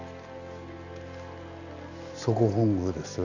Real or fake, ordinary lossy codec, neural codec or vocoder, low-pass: real; none; none; 7.2 kHz